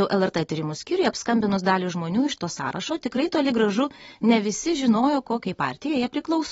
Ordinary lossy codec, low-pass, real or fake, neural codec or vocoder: AAC, 24 kbps; 19.8 kHz; real; none